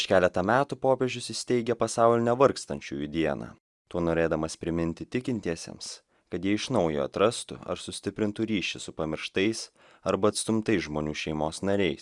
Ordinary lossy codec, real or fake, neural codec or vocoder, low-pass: Opus, 64 kbps; real; none; 10.8 kHz